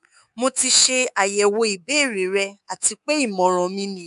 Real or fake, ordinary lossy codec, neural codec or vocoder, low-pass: fake; none; codec, 24 kHz, 3.1 kbps, DualCodec; 10.8 kHz